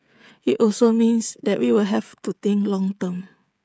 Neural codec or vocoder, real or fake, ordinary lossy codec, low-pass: codec, 16 kHz, 16 kbps, FreqCodec, smaller model; fake; none; none